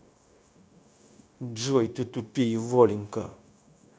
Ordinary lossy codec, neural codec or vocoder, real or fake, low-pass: none; codec, 16 kHz, 0.9 kbps, LongCat-Audio-Codec; fake; none